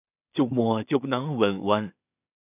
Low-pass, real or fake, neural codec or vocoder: 3.6 kHz; fake; codec, 16 kHz in and 24 kHz out, 0.4 kbps, LongCat-Audio-Codec, two codebook decoder